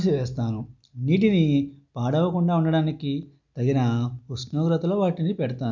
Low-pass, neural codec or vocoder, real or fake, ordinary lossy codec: 7.2 kHz; none; real; none